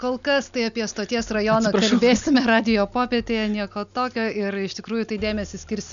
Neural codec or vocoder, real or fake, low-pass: none; real; 7.2 kHz